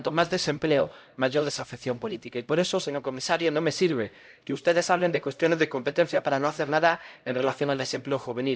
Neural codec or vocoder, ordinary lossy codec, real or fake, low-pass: codec, 16 kHz, 0.5 kbps, X-Codec, HuBERT features, trained on LibriSpeech; none; fake; none